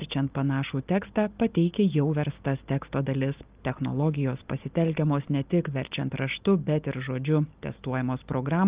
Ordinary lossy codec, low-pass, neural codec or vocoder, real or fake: Opus, 24 kbps; 3.6 kHz; none; real